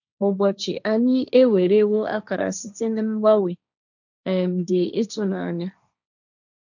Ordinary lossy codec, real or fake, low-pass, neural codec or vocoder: none; fake; 7.2 kHz; codec, 16 kHz, 1.1 kbps, Voila-Tokenizer